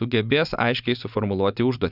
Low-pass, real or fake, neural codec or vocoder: 5.4 kHz; fake; vocoder, 24 kHz, 100 mel bands, Vocos